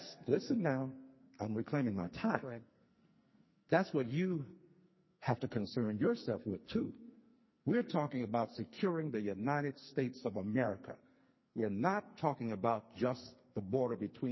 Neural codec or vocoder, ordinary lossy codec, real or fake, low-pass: codec, 44.1 kHz, 2.6 kbps, SNAC; MP3, 24 kbps; fake; 7.2 kHz